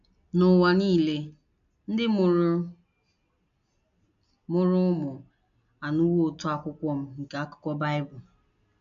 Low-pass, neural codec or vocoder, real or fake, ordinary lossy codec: 7.2 kHz; none; real; none